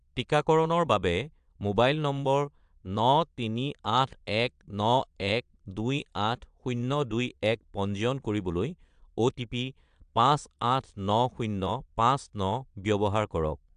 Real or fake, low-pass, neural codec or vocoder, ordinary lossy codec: fake; 9.9 kHz; vocoder, 22.05 kHz, 80 mel bands, Vocos; AAC, 96 kbps